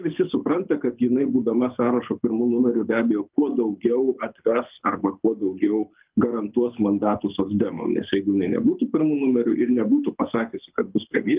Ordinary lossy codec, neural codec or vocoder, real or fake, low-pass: Opus, 32 kbps; codec, 24 kHz, 6 kbps, HILCodec; fake; 3.6 kHz